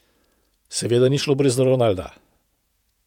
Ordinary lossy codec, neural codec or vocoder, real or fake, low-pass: none; vocoder, 48 kHz, 128 mel bands, Vocos; fake; 19.8 kHz